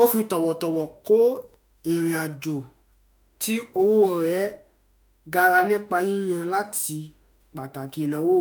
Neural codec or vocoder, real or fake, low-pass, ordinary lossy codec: autoencoder, 48 kHz, 32 numbers a frame, DAC-VAE, trained on Japanese speech; fake; none; none